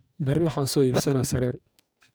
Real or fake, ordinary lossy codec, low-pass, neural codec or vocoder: fake; none; none; codec, 44.1 kHz, 2.6 kbps, DAC